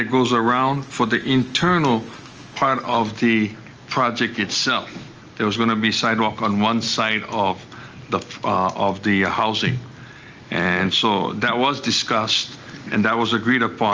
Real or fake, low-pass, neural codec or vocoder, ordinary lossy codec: real; 7.2 kHz; none; Opus, 24 kbps